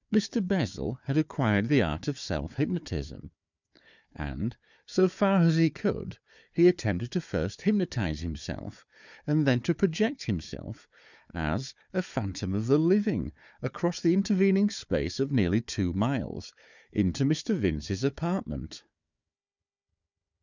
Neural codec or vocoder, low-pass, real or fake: codec, 16 kHz, 4 kbps, FunCodec, trained on Chinese and English, 50 frames a second; 7.2 kHz; fake